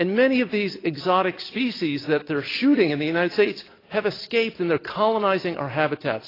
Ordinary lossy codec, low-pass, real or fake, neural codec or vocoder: AAC, 24 kbps; 5.4 kHz; real; none